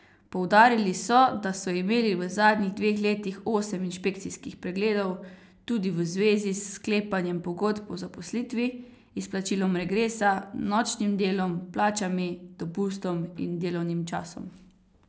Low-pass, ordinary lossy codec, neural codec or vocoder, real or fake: none; none; none; real